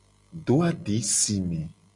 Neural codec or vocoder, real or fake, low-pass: none; real; 10.8 kHz